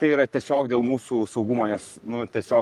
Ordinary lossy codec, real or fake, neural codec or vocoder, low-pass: Opus, 32 kbps; fake; codec, 44.1 kHz, 3.4 kbps, Pupu-Codec; 14.4 kHz